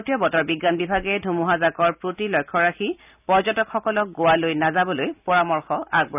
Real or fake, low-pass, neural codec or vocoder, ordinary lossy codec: real; 3.6 kHz; none; none